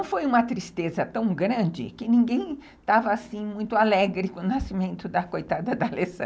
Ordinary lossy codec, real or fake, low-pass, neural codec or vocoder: none; real; none; none